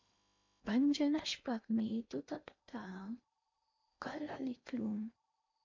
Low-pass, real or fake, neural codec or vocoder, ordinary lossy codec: 7.2 kHz; fake; codec, 16 kHz in and 24 kHz out, 0.8 kbps, FocalCodec, streaming, 65536 codes; MP3, 48 kbps